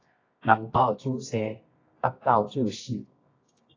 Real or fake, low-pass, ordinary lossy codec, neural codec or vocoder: fake; 7.2 kHz; AAC, 32 kbps; codec, 24 kHz, 0.9 kbps, WavTokenizer, medium music audio release